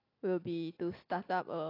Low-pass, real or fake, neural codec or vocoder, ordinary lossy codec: 5.4 kHz; real; none; AAC, 24 kbps